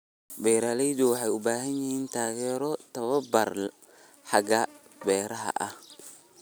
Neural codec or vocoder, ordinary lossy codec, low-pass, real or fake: none; none; none; real